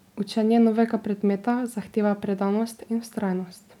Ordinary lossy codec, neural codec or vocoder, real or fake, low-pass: none; none; real; 19.8 kHz